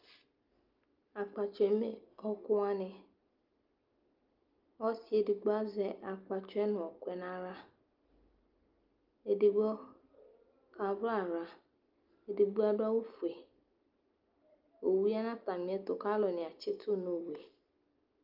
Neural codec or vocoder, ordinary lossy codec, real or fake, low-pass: none; Opus, 24 kbps; real; 5.4 kHz